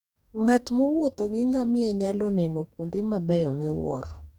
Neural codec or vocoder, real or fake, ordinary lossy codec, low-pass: codec, 44.1 kHz, 2.6 kbps, DAC; fake; none; 19.8 kHz